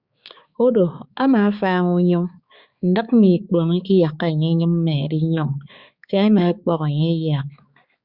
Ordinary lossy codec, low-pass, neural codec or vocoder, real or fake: Opus, 64 kbps; 5.4 kHz; codec, 16 kHz, 4 kbps, X-Codec, HuBERT features, trained on balanced general audio; fake